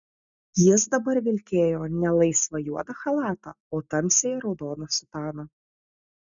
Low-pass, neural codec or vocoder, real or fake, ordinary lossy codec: 7.2 kHz; none; real; MP3, 96 kbps